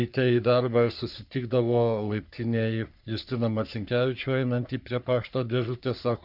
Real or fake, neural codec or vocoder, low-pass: fake; codec, 44.1 kHz, 3.4 kbps, Pupu-Codec; 5.4 kHz